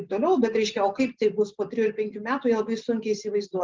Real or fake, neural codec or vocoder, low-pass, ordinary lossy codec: real; none; 7.2 kHz; Opus, 32 kbps